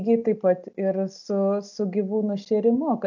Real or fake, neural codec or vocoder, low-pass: real; none; 7.2 kHz